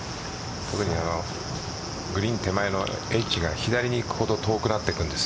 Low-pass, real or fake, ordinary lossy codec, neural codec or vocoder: none; real; none; none